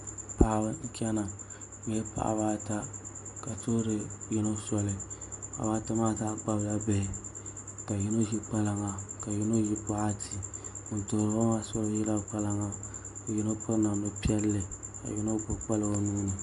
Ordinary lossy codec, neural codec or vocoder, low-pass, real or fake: Opus, 64 kbps; none; 10.8 kHz; real